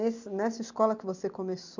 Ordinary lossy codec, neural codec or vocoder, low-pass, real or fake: none; none; 7.2 kHz; real